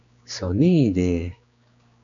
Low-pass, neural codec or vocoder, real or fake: 7.2 kHz; codec, 16 kHz, 2 kbps, X-Codec, HuBERT features, trained on general audio; fake